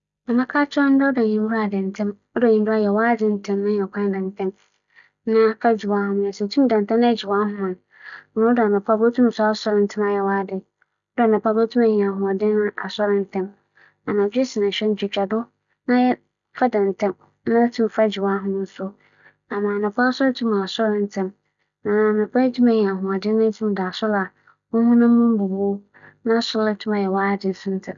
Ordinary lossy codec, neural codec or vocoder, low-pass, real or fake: none; none; 7.2 kHz; real